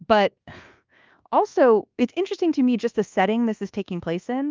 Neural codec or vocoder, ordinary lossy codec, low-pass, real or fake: codec, 24 kHz, 1.2 kbps, DualCodec; Opus, 24 kbps; 7.2 kHz; fake